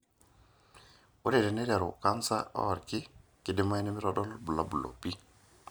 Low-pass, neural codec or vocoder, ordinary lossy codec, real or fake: none; none; none; real